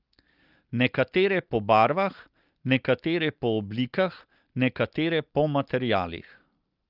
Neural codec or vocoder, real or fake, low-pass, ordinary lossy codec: none; real; 5.4 kHz; Opus, 24 kbps